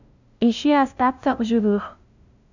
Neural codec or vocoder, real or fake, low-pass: codec, 16 kHz, 0.5 kbps, FunCodec, trained on LibriTTS, 25 frames a second; fake; 7.2 kHz